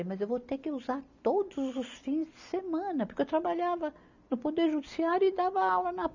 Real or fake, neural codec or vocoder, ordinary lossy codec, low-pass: real; none; none; 7.2 kHz